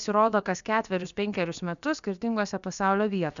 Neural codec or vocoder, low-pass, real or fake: codec, 16 kHz, about 1 kbps, DyCAST, with the encoder's durations; 7.2 kHz; fake